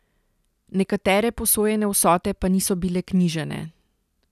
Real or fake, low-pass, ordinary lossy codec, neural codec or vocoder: real; 14.4 kHz; none; none